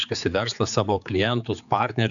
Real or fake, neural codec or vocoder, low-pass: fake; codec, 16 kHz, 4 kbps, X-Codec, HuBERT features, trained on general audio; 7.2 kHz